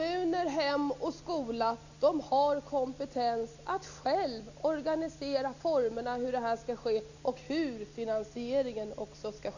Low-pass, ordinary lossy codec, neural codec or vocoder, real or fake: 7.2 kHz; none; none; real